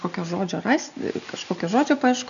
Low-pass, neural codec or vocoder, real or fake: 7.2 kHz; none; real